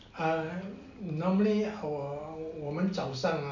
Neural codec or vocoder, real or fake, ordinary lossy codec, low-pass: none; real; none; 7.2 kHz